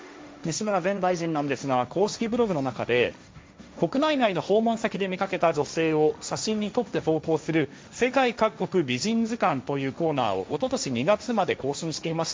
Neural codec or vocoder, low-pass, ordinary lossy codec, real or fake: codec, 16 kHz, 1.1 kbps, Voila-Tokenizer; none; none; fake